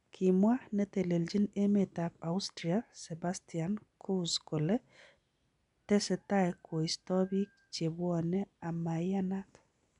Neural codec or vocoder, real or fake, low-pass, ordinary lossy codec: none; real; 10.8 kHz; none